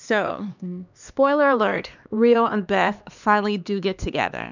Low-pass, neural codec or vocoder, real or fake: 7.2 kHz; codec, 16 kHz, 2 kbps, X-Codec, HuBERT features, trained on LibriSpeech; fake